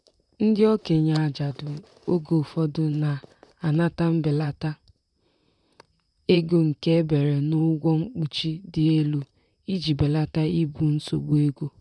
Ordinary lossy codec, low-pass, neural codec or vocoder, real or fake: none; 10.8 kHz; vocoder, 44.1 kHz, 128 mel bands, Pupu-Vocoder; fake